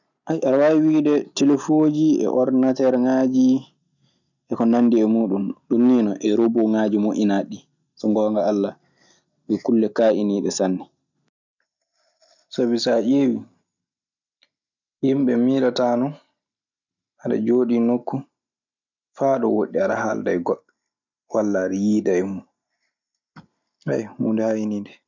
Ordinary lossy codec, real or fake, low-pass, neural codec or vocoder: none; real; 7.2 kHz; none